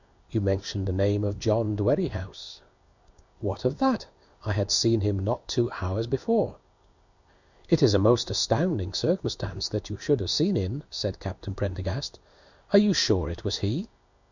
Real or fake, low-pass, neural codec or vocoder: fake; 7.2 kHz; codec, 16 kHz in and 24 kHz out, 1 kbps, XY-Tokenizer